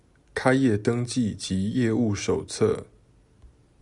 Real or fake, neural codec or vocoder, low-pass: real; none; 10.8 kHz